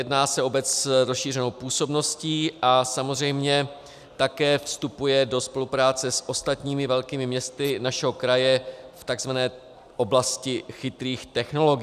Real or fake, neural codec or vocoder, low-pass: real; none; 14.4 kHz